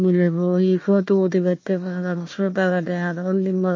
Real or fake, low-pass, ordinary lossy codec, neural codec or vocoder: fake; 7.2 kHz; MP3, 32 kbps; codec, 16 kHz, 1 kbps, FunCodec, trained on Chinese and English, 50 frames a second